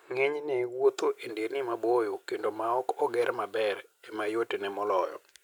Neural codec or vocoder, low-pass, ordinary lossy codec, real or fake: none; none; none; real